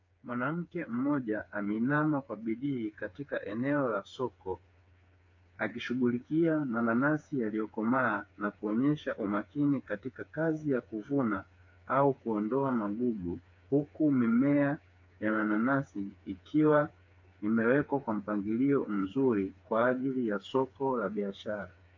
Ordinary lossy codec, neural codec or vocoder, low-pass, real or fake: MP3, 48 kbps; codec, 16 kHz, 4 kbps, FreqCodec, smaller model; 7.2 kHz; fake